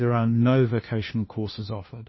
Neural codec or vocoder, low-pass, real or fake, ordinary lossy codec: codec, 16 kHz, 1 kbps, FunCodec, trained on LibriTTS, 50 frames a second; 7.2 kHz; fake; MP3, 24 kbps